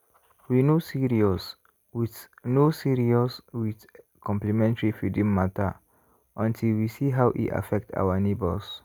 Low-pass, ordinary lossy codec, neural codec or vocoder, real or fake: none; none; none; real